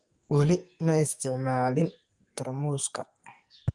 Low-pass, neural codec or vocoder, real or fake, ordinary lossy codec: 10.8 kHz; codec, 44.1 kHz, 2.6 kbps, SNAC; fake; Opus, 32 kbps